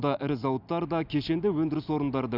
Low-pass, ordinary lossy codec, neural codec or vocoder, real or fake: 5.4 kHz; none; none; real